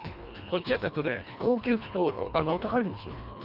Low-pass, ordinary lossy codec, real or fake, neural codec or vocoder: 5.4 kHz; none; fake; codec, 24 kHz, 1.5 kbps, HILCodec